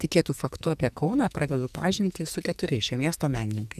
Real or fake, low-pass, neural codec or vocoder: fake; 14.4 kHz; codec, 44.1 kHz, 2.6 kbps, SNAC